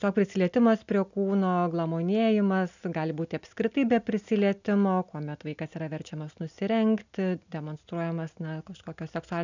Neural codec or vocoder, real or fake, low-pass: none; real; 7.2 kHz